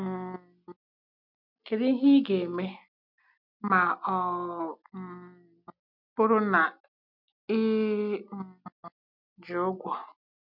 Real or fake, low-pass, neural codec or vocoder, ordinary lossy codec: real; 5.4 kHz; none; none